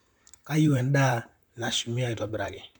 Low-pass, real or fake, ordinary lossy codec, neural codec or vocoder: 19.8 kHz; fake; none; vocoder, 44.1 kHz, 128 mel bands, Pupu-Vocoder